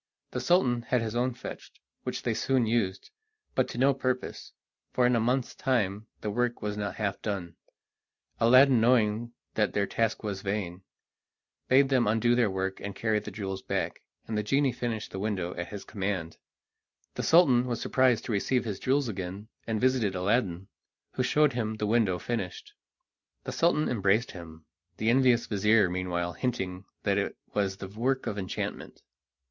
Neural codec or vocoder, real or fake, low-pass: none; real; 7.2 kHz